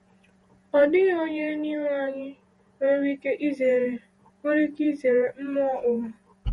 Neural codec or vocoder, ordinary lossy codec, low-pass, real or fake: vocoder, 48 kHz, 128 mel bands, Vocos; MP3, 48 kbps; 19.8 kHz; fake